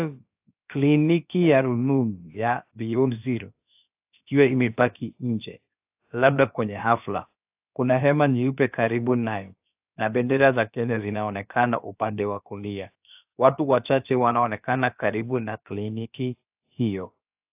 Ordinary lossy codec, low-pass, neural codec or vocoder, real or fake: AAC, 32 kbps; 3.6 kHz; codec, 16 kHz, about 1 kbps, DyCAST, with the encoder's durations; fake